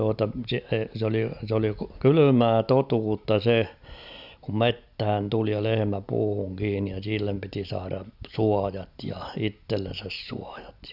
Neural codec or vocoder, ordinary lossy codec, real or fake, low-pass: none; none; real; 5.4 kHz